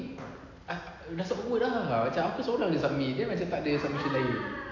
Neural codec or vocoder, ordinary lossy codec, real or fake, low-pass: none; AAC, 48 kbps; real; 7.2 kHz